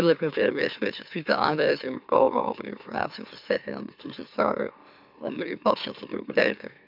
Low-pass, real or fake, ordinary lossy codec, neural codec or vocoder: 5.4 kHz; fake; AAC, 48 kbps; autoencoder, 44.1 kHz, a latent of 192 numbers a frame, MeloTTS